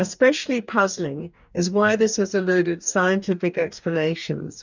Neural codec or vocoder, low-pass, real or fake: codec, 44.1 kHz, 2.6 kbps, DAC; 7.2 kHz; fake